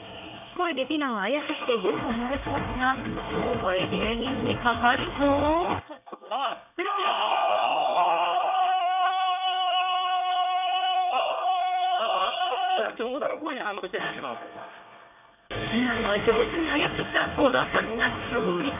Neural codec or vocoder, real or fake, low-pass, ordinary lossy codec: codec, 24 kHz, 1 kbps, SNAC; fake; 3.6 kHz; none